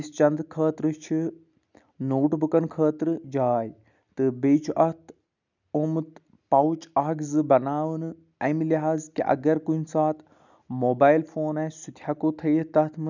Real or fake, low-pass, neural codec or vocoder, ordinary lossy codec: real; 7.2 kHz; none; none